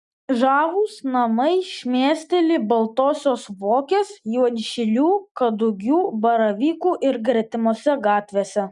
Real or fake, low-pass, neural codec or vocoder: real; 10.8 kHz; none